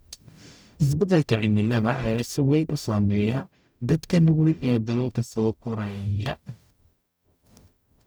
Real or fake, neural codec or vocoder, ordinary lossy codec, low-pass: fake; codec, 44.1 kHz, 0.9 kbps, DAC; none; none